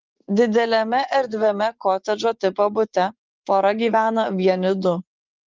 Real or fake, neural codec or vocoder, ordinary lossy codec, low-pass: fake; vocoder, 24 kHz, 100 mel bands, Vocos; Opus, 32 kbps; 7.2 kHz